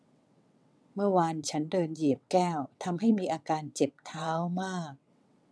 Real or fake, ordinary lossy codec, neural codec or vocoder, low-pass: fake; none; vocoder, 22.05 kHz, 80 mel bands, WaveNeXt; none